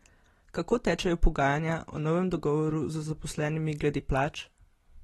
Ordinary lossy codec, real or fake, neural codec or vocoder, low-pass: AAC, 32 kbps; real; none; 19.8 kHz